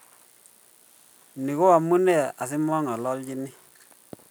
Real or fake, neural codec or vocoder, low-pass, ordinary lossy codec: real; none; none; none